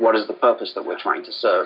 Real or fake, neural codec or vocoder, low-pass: real; none; 5.4 kHz